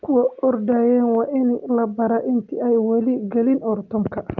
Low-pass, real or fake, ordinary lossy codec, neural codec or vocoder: 7.2 kHz; real; Opus, 24 kbps; none